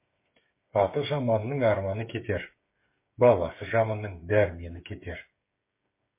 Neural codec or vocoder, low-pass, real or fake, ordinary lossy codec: codec, 16 kHz, 8 kbps, FreqCodec, smaller model; 3.6 kHz; fake; MP3, 24 kbps